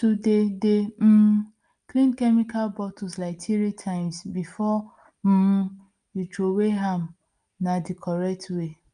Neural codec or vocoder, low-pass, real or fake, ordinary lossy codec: none; 9.9 kHz; real; Opus, 32 kbps